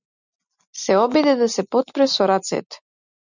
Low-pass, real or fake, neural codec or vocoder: 7.2 kHz; real; none